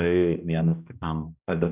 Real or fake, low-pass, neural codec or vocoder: fake; 3.6 kHz; codec, 16 kHz, 1 kbps, X-Codec, HuBERT features, trained on balanced general audio